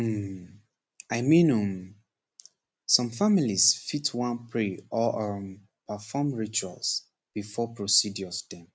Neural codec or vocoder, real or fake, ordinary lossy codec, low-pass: none; real; none; none